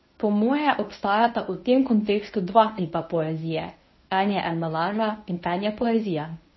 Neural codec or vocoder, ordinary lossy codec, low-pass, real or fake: codec, 24 kHz, 0.9 kbps, WavTokenizer, medium speech release version 1; MP3, 24 kbps; 7.2 kHz; fake